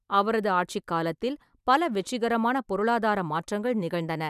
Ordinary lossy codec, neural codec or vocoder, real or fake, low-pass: none; none; real; 14.4 kHz